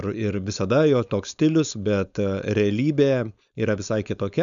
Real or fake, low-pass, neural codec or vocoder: fake; 7.2 kHz; codec, 16 kHz, 4.8 kbps, FACodec